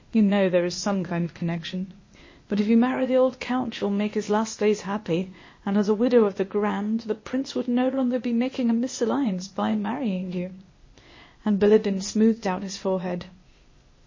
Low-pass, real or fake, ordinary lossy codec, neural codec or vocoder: 7.2 kHz; fake; MP3, 32 kbps; codec, 16 kHz, 0.8 kbps, ZipCodec